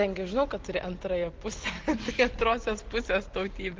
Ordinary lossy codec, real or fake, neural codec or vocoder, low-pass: Opus, 16 kbps; real; none; 7.2 kHz